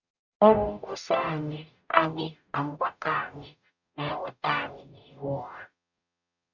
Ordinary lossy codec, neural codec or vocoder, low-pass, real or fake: none; codec, 44.1 kHz, 0.9 kbps, DAC; 7.2 kHz; fake